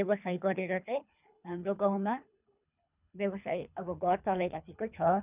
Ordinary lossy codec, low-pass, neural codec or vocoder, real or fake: none; 3.6 kHz; codec, 24 kHz, 3 kbps, HILCodec; fake